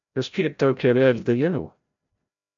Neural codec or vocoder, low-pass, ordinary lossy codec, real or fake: codec, 16 kHz, 0.5 kbps, FreqCodec, larger model; 7.2 kHz; AAC, 64 kbps; fake